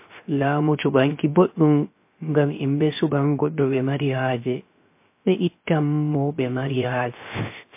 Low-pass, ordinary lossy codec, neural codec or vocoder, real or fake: 3.6 kHz; MP3, 24 kbps; codec, 16 kHz, 0.3 kbps, FocalCodec; fake